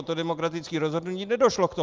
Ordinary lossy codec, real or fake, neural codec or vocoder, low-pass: Opus, 32 kbps; real; none; 7.2 kHz